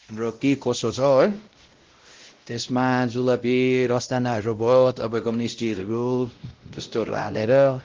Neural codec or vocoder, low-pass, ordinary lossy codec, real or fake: codec, 16 kHz, 0.5 kbps, X-Codec, WavLM features, trained on Multilingual LibriSpeech; 7.2 kHz; Opus, 16 kbps; fake